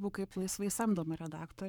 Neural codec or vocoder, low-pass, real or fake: codec, 44.1 kHz, 7.8 kbps, Pupu-Codec; 19.8 kHz; fake